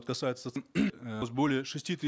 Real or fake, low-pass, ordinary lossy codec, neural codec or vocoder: real; none; none; none